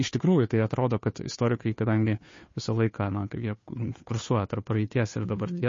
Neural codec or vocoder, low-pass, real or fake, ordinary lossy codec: codec, 16 kHz, 2 kbps, FunCodec, trained on Chinese and English, 25 frames a second; 7.2 kHz; fake; MP3, 32 kbps